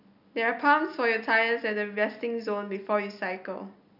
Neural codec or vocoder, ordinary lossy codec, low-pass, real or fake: none; none; 5.4 kHz; real